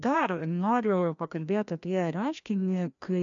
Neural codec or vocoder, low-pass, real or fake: codec, 16 kHz, 1 kbps, FreqCodec, larger model; 7.2 kHz; fake